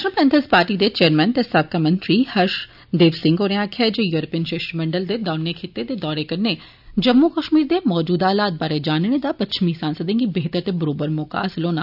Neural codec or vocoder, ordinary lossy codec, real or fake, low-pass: none; none; real; 5.4 kHz